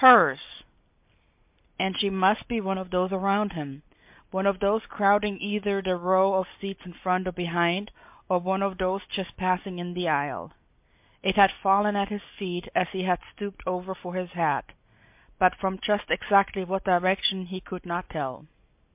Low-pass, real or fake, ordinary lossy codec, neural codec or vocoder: 3.6 kHz; real; MP3, 32 kbps; none